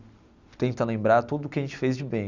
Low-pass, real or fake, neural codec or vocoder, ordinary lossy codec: 7.2 kHz; real; none; Opus, 64 kbps